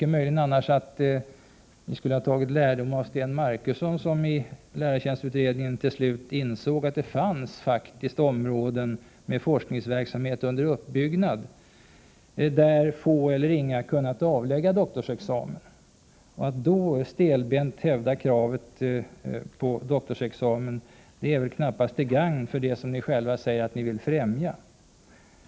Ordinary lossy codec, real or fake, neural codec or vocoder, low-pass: none; real; none; none